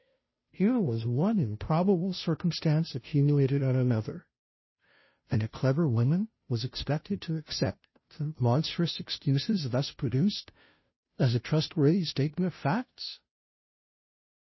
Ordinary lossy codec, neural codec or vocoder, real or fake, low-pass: MP3, 24 kbps; codec, 16 kHz, 0.5 kbps, FunCodec, trained on Chinese and English, 25 frames a second; fake; 7.2 kHz